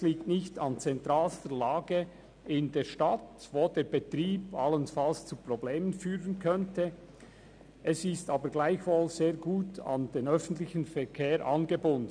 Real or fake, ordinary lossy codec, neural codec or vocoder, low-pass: real; none; none; 9.9 kHz